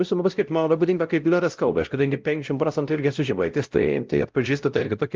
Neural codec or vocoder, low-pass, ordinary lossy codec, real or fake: codec, 16 kHz, 0.5 kbps, X-Codec, WavLM features, trained on Multilingual LibriSpeech; 7.2 kHz; Opus, 24 kbps; fake